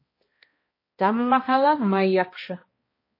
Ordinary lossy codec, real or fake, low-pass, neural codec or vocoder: MP3, 24 kbps; fake; 5.4 kHz; codec, 16 kHz, 1 kbps, X-Codec, HuBERT features, trained on balanced general audio